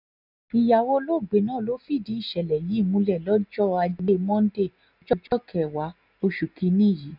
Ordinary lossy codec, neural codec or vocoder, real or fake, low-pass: none; none; real; 5.4 kHz